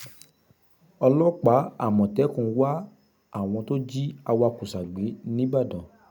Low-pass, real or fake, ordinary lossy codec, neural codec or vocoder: none; real; none; none